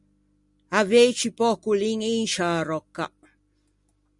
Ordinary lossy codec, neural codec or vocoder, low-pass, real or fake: Opus, 64 kbps; none; 10.8 kHz; real